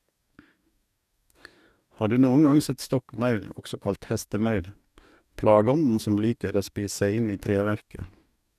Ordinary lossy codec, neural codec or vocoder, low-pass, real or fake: none; codec, 44.1 kHz, 2.6 kbps, DAC; 14.4 kHz; fake